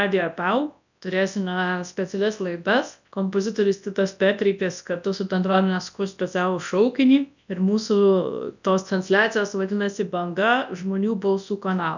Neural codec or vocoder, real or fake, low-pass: codec, 24 kHz, 0.9 kbps, WavTokenizer, large speech release; fake; 7.2 kHz